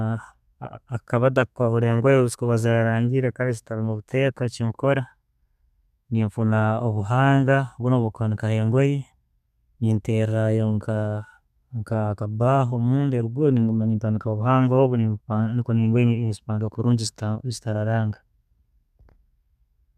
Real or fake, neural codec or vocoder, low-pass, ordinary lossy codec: fake; codec, 32 kHz, 1.9 kbps, SNAC; 14.4 kHz; none